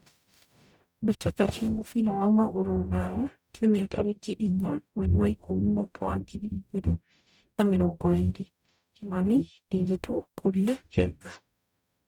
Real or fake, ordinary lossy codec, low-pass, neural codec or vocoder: fake; none; 19.8 kHz; codec, 44.1 kHz, 0.9 kbps, DAC